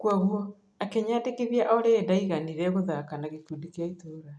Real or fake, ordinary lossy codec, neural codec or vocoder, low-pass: real; none; none; none